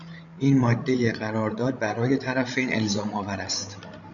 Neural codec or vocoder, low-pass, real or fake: codec, 16 kHz, 16 kbps, FreqCodec, larger model; 7.2 kHz; fake